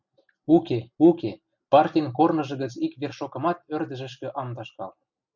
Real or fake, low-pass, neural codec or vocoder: real; 7.2 kHz; none